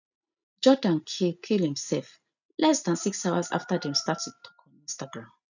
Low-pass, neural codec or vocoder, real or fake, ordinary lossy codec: 7.2 kHz; none; real; none